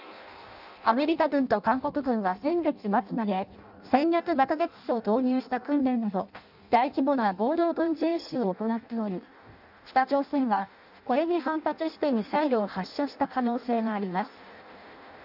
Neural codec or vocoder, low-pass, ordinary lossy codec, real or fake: codec, 16 kHz in and 24 kHz out, 0.6 kbps, FireRedTTS-2 codec; 5.4 kHz; none; fake